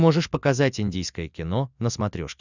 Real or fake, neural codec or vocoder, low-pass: real; none; 7.2 kHz